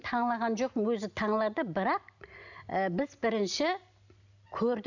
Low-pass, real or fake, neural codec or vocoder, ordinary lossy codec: 7.2 kHz; real; none; none